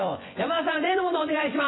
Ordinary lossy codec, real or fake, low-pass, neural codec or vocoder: AAC, 16 kbps; fake; 7.2 kHz; vocoder, 24 kHz, 100 mel bands, Vocos